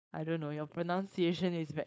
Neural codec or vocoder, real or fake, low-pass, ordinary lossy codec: codec, 16 kHz, 4.8 kbps, FACodec; fake; none; none